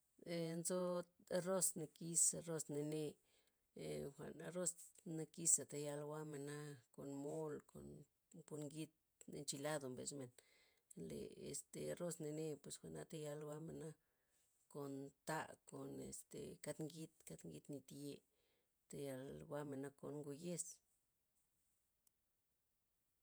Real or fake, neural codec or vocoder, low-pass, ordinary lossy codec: fake; vocoder, 48 kHz, 128 mel bands, Vocos; none; none